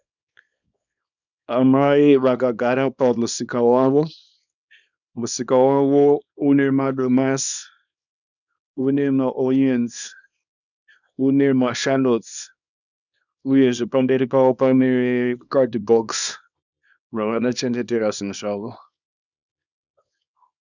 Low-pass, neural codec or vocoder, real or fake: 7.2 kHz; codec, 24 kHz, 0.9 kbps, WavTokenizer, small release; fake